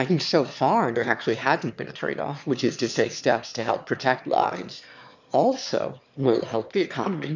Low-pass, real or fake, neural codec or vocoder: 7.2 kHz; fake; autoencoder, 22.05 kHz, a latent of 192 numbers a frame, VITS, trained on one speaker